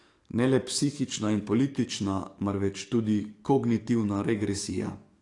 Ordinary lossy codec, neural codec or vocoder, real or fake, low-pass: AAC, 48 kbps; codec, 44.1 kHz, 7.8 kbps, DAC; fake; 10.8 kHz